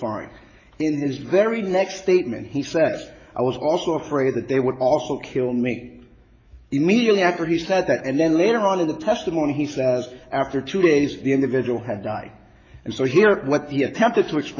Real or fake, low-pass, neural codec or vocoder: fake; 7.2 kHz; autoencoder, 48 kHz, 128 numbers a frame, DAC-VAE, trained on Japanese speech